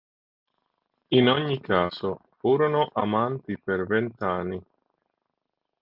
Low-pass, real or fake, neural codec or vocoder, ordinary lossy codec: 5.4 kHz; real; none; Opus, 32 kbps